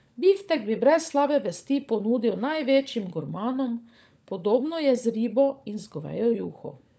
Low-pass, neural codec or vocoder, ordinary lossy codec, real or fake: none; codec, 16 kHz, 16 kbps, FunCodec, trained on LibriTTS, 50 frames a second; none; fake